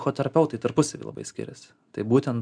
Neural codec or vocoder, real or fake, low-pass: none; real; 9.9 kHz